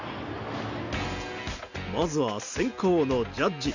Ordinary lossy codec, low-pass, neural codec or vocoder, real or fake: none; 7.2 kHz; none; real